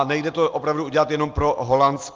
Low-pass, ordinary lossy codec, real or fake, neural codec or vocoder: 7.2 kHz; Opus, 32 kbps; real; none